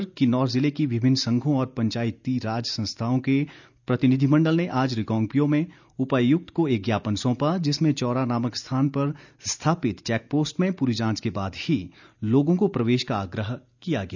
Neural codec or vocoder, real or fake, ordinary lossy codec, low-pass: none; real; none; 7.2 kHz